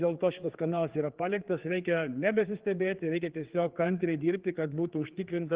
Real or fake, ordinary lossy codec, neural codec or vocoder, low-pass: fake; Opus, 16 kbps; codec, 16 kHz, 4 kbps, X-Codec, HuBERT features, trained on general audio; 3.6 kHz